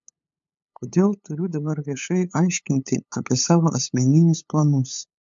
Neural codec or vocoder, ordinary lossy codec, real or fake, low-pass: codec, 16 kHz, 8 kbps, FunCodec, trained on LibriTTS, 25 frames a second; MP3, 64 kbps; fake; 7.2 kHz